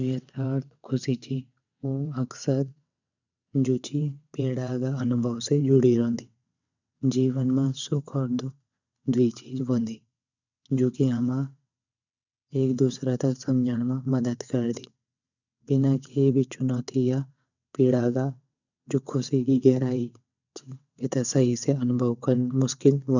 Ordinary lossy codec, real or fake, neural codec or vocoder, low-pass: none; fake; vocoder, 22.05 kHz, 80 mel bands, WaveNeXt; 7.2 kHz